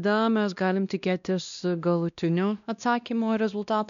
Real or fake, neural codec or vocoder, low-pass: fake; codec, 16 kHz, 1 kbps, X-Codec, WavLM features, trained on Multilingual LibriSpeech; 7.2 kHz